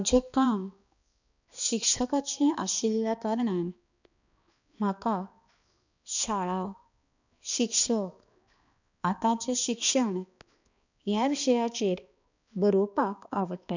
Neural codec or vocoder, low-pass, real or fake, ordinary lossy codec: codec, 16 kHz, 2 kbps, X-Codec, HuBERT features, trained on balanced general audio; 7.2 kHz; fake; none